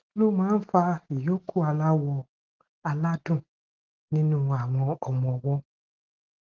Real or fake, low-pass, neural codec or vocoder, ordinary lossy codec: real; 7.2 kHz; none; Opus, 16 kbps